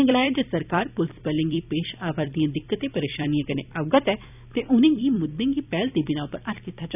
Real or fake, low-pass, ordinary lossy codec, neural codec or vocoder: fake; 3.6 kHz; none; vocoder, 44.1 kHz, 128 mel bands every 256 samples, BigVGAN v2